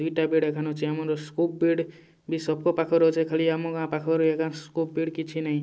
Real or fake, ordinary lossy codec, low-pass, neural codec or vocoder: real; none; none; none